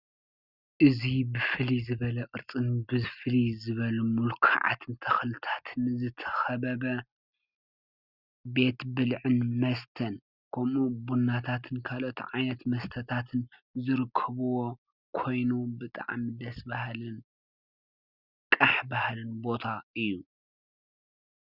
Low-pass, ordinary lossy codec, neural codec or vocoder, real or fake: 5.4 kHz; Opus, 64 kbps; none; real